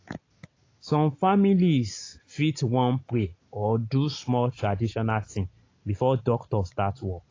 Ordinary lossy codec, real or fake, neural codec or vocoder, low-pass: AAC, 32 kbps; real; none; 7.2 kHz